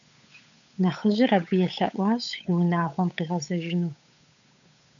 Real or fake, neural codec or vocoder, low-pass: fake; codec, 16 kHz, 8 kbps, FunCodec, trained on Chinese and English, 25 frames a second; 7.2 kHz